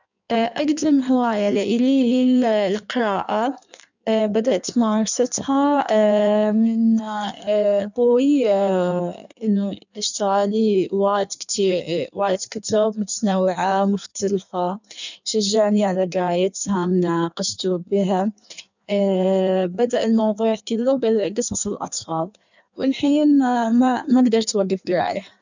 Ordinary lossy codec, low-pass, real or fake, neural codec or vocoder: none; 7.2 kHz; fake; codec, 16 kHz in and 24 kHz out, 1.1 kbps, FireRedTTS-2 codec